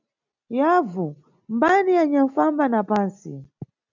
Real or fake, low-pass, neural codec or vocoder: real; 7.2 kHz; none